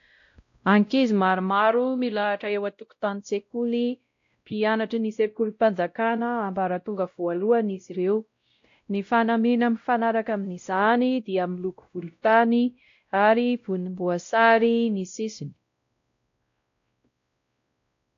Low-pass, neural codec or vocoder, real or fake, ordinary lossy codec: 7.2 kHz; codec, 16 kHz, 0.5 kbps, X-Codec, WavLM features, trained on Multilingual LibriSpeech; fake; AAC, 48 kbps